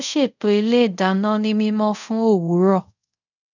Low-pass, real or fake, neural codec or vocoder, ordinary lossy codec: 7.2 kHz; fake; codec, 24 kHz, 0.5 kbps, DualCodec; none